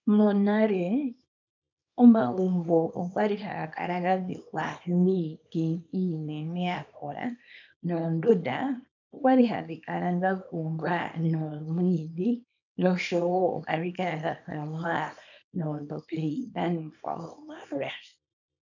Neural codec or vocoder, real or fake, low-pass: codec, 24 kHz, 0.9 kbps, WavTokenizer, small release; fake; 7.2 kHz